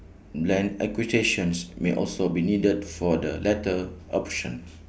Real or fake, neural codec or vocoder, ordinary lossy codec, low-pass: real; none; none; none